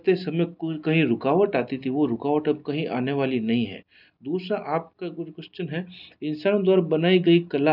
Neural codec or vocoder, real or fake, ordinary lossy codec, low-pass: none; real; none; 5.4 kHz